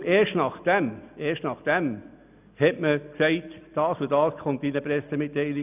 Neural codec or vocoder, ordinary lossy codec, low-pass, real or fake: none; none; 3.6 kHz; real